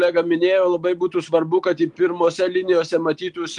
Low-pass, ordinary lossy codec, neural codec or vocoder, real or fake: 10.8 kHz; Opus, 32 kbps; none; real